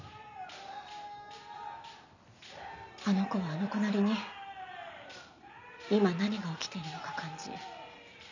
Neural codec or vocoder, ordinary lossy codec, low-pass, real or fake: none; none; 7.2 kHz; real